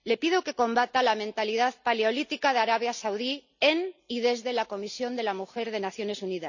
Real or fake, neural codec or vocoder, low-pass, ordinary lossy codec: real; none; 7.2 kHz; none